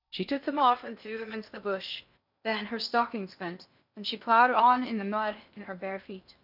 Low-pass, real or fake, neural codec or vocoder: 5.4 kHz; fake; codec, 16 kHz in and 24 kHz out, 0.6 kbps, FocalCodec, streaming, 4096 codes